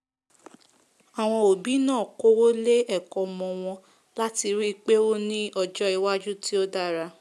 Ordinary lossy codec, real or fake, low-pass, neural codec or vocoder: none; real; none; none